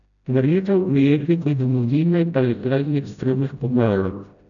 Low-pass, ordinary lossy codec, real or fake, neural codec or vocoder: 7.2 kHz; none; fake; codec, 16 kHz, 0.5 kbps, FreqCodec, smaller model